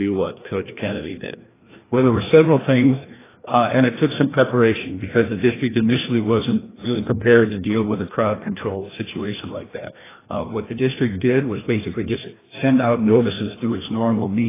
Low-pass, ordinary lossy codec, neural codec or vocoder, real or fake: 3.6 kHz; AAC, 16 kbps; codec, 16 kHz, 1 kbps, FreqCodec, larger model; fake